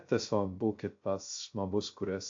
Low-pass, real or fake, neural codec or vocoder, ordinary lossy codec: 7.2 kHz; fake; codec, 16 kHz, 0.2 kbps, FocalCodec; AAC, 48 kbps